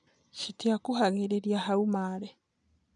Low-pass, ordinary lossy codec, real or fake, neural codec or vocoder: 9.9 kHz; none; real; none